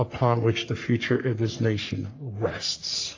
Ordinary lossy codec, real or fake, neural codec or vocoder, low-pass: AAC, 32 kbps; fake; codec, 44.1 kHz, 3.4 kbps, Pupu-Codec; 7.2 kHz